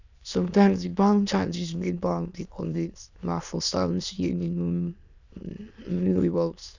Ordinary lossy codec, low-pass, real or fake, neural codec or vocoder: none; 7.2 kHz; fake; autoencoder, 22.05 kHz, a latent of 192 numbers a frame, VITS, trained on many speakers